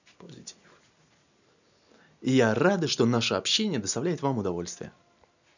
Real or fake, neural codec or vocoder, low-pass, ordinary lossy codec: real; none; 7.2 kHz; none